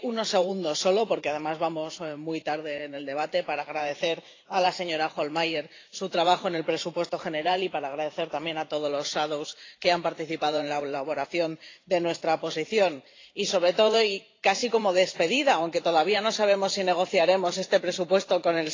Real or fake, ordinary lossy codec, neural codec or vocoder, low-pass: fake; AAC, 32 kbps; vocoder, 44.1 kHz, 128 mel bands every 512 samples, BigVGAN v2; 7.2 kHz